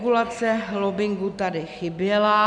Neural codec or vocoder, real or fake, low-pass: none; real; 9.9 kHz